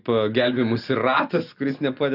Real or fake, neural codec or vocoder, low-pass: real; none; 5.4 kHz